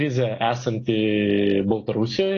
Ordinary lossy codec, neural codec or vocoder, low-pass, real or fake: AAC, 32 kbps; none; 7.2 kHz; real